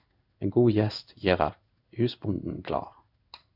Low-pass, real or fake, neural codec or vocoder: 5.4 kHz; fake; codec, 16 kHz in and 24 kHz out, 1 kbps, XY-Tokenizer